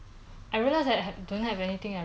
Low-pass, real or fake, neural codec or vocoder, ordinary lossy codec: none; real; none; none